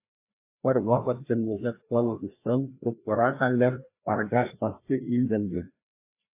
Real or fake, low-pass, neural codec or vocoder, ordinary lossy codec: fake; 3.6 kHz; codec, 16 kHz, 1 kbps, FreqCodec, larger model; AAC, 24 kbps